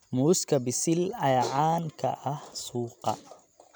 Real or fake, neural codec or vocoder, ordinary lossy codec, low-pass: real; none; none; none